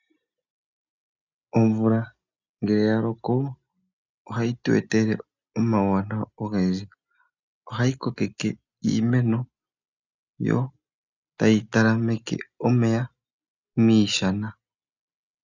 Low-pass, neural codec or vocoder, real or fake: 7.2 kHz; none; real